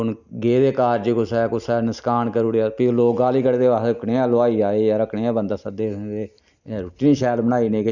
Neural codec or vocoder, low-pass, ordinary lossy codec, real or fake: none; 7.2 kHz; none; real